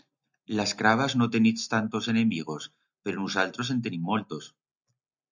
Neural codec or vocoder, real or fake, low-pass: none; real; 7.2 kHz